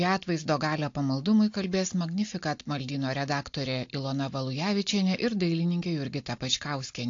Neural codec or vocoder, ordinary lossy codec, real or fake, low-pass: none; AAC, 48 kbps; real; 7.2 kHz